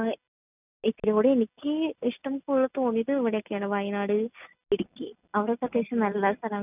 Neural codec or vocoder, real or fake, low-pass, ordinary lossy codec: none; real; 3.6 kHz; none